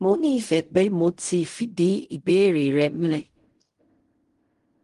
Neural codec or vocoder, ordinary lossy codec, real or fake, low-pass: codec, 16 kHz in and 24 kHz out, 0.4 kbps, LongCat-Audio-Codec, fine tuned four codebook decoder; Opus, 24 kbps; fake; 10.8 kHz